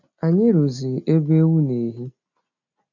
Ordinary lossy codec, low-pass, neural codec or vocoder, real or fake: none; 7.2 kHz; none; real